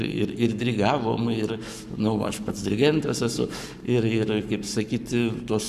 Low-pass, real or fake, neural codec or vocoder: 14.4 kHz; fake; codec, 44.1 kHz, 7.8 kbps, Pupu-Codec